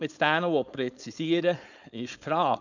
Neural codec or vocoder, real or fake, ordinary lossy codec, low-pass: codec, 16 kHz, 4.8 kbps, FACodec; fake; none; 7.2 kHz